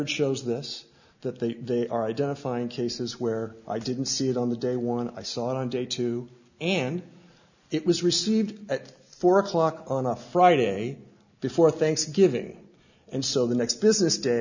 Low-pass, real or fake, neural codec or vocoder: 7.2 kHz; real; none